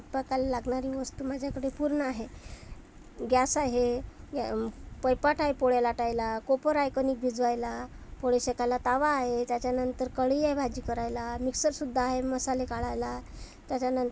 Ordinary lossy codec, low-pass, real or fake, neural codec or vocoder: none; none; real; none